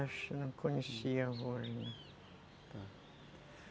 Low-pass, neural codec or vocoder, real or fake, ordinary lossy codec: none; none; real; none